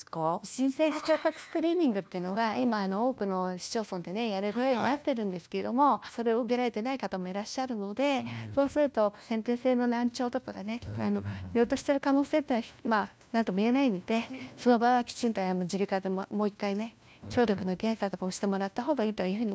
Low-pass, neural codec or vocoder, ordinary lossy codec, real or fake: none; codec, 16 kHz, 1 kbps, FunCodec, trained on LibriTTS, 50 frames a second; none; fake